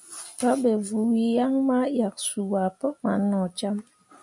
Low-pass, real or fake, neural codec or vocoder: 10.8 kHz; real; none